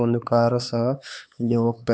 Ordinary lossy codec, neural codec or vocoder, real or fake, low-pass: none; codec, 16 kHz, 4 kbps, X-Codec, HuBERT features, trained on LibriSpeech; fake; none